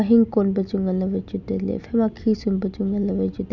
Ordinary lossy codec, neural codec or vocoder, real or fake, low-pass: none; none; real; 7.2 kHz